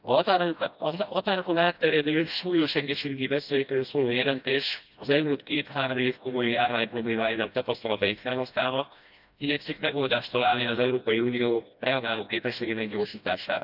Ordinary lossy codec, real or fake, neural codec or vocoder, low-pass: none; fake; codec, 16 kHz, 1 kbps, FreqCodec, smaller model; 5.4 kHz